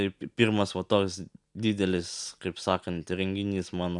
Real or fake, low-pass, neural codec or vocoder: real; 10.8 kHz; none